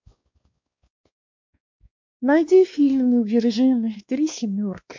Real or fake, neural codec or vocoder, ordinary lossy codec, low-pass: fake; codec, 16 kHz, 2 kbps, X-Codec, WavLM features, trained on Multilingual LibriSpeech; MP3, 48 kbps; 7.2 kHz